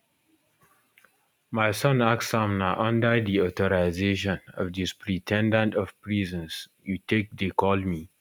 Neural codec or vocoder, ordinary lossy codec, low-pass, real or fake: none; none; 19.8 kHz; real